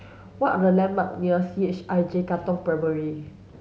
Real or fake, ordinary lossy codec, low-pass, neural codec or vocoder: real; none; none; none